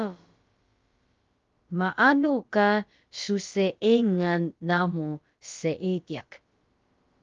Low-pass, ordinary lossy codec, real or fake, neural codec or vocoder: 7.2 kHz; Opus, 32 kbps; fake; codec, 16 kHz, about 1 kbps, DyCAST, with the encoder's durations